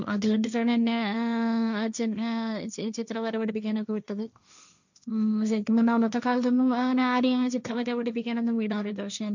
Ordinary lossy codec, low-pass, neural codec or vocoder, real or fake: none; 7.2 kHz; codec, 16 kHz, 1.1 kbps, Voila-Tokenizer; fake